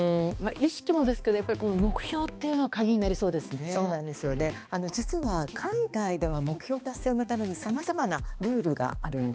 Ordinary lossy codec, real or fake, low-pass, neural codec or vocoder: none; fake; none; codec, 16 kHz, 2 kbps, X-Codec, HuBERT features, trained on balanced general audio